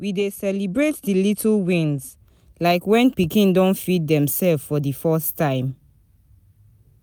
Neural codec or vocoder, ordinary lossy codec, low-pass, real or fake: none; none; none; real